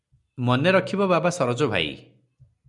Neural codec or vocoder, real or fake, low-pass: none; real; 10.8 kHz